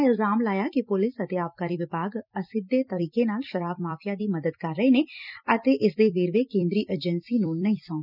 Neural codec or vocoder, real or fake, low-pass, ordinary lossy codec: vocoder, 44.1 kHz, 128 mel bands every 256 samples, BigVGAN v2; fake; 5.4 kHz; none